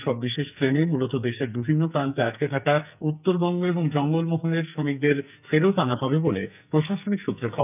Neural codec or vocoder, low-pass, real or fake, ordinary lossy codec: codec, 44.1 kHz, 2.6 kbps, SNAC; 3.6 kHz; fake; none